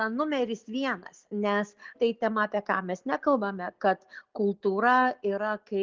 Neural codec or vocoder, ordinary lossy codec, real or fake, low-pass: none; Opus, 24 kbps; real; 7.2 kHz